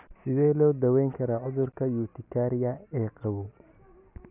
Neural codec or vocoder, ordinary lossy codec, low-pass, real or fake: none; none; 3.6 kHz; real